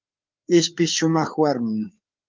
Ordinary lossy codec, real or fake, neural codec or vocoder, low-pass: Opus, 24 kbps; fake; codec, 16 kHz, 4 kbps, FreqCodec, larger model; 7.2 kHz